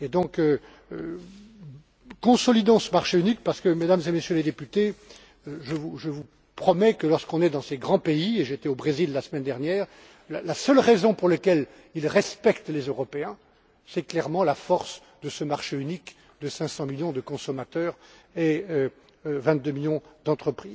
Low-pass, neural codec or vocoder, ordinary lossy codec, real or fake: none; none; none; real